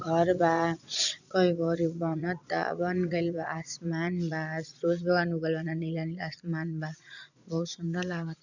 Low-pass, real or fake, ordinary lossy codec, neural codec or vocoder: 7.2 kHz; fake; none; vocoder, 22.05 kHz, 80 mel bands, Vocos